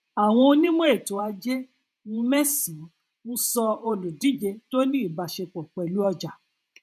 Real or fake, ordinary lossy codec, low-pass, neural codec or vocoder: fake; none; 14.4 kHz; vocoder, 48 kHz, 128 mel bands, Vocos